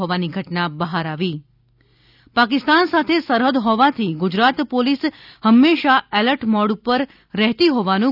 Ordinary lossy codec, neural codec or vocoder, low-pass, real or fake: none; none; 5.4 kHz; real